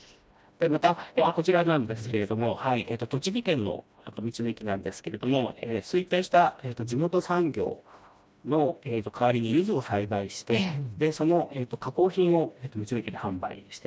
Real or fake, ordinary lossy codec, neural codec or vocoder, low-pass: fake; none; codec, 16 kHz, 1 kbps, FreqCodec, smaller model; none